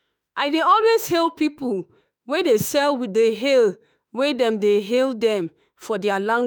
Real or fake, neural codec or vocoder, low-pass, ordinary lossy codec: fake; autoencoder, 48 kHz, 32 numbers a frame, DAC-VAE, trained on Japanese speech; none; none